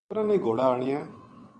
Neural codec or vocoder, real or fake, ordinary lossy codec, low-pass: vocoder, 22.05 kHz, 80 mel bands, WaveNeXt; fake; Opus, 64 kbps; 9.9 kHz